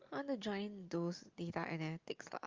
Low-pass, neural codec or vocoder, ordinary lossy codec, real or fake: 7.2 kHz; none; Opus, 32 kbps; real